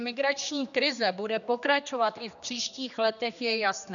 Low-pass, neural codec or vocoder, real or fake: 7.2 kHz; codec, 16 kHz, 2 kbps, X-Codec, HuBERT features, trained on general audio; fake